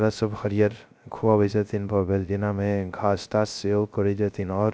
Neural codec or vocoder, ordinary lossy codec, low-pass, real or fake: codec, 16 kHz, 0.3 kbps, FocalCodec; none; none; fake